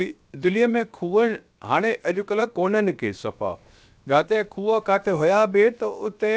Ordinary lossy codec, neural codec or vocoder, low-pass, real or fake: none; codec, 16 kHz, 0.7 kbps, FocalCodec; none; fake